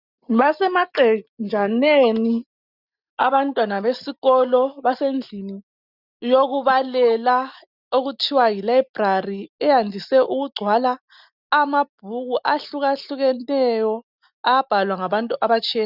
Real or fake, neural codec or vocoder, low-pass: real; none; 5.4 kHz